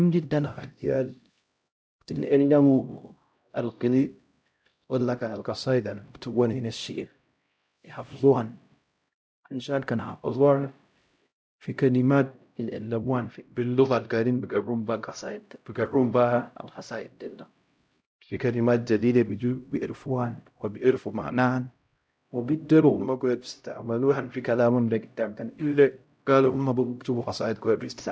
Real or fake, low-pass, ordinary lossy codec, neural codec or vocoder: fake; none; none; codec, 16 kHz, 0.5 kbps, X-Codec, HuBERT features, trained on LibriSpeech